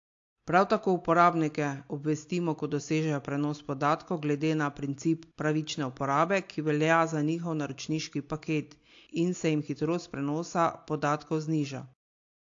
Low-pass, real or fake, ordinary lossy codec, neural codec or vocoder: 7.2 kHz; real; AAC, 48 kbps; none